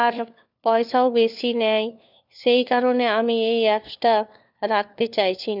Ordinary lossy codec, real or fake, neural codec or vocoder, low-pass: none; fake; codec, 16 kHz, 4 kbps, FunCodec, trained on LibriTTS, 50 frames a second; 5.4 kHz